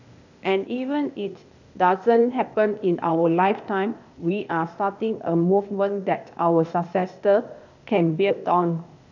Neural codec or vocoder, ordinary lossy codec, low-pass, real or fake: codec, 16 kHz, 0.8 kbps, ZipCodec; none; 7.2 kHz; fake